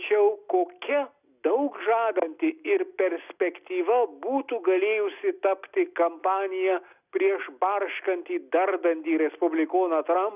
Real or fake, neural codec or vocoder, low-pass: real; none; 3.6 kHz